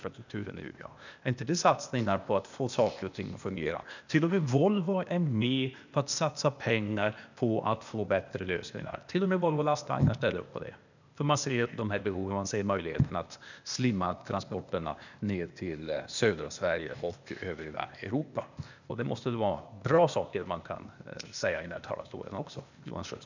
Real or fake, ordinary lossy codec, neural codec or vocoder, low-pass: fake; none; codec, 16 kHz, 0.8 kbps, ZipCodec; 7.2 kHz